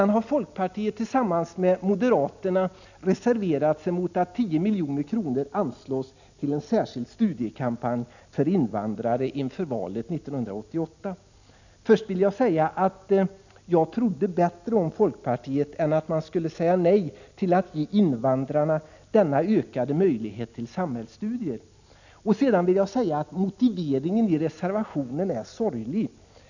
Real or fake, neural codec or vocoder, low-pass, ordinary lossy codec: real; none; 7.2 kHz; none